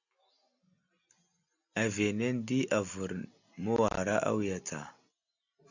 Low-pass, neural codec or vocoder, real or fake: 7.2 kHz; none; real